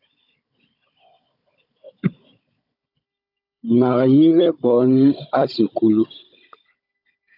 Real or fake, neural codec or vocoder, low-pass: fake; codec, 16 kHz, 16 kbps, FunCodec, trained on Chinese and English, 50 frames a second; 5.4 kHz